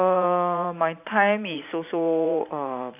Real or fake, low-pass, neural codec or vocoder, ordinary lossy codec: fake; 3.6 kHz; vocoder, 44.1 kHz, 128 mel bands every 512 samples, BigVGAN v2; AAC, 32 kbps